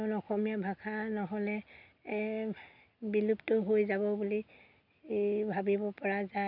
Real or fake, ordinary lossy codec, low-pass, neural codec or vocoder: real; none; 5.4 kHz; none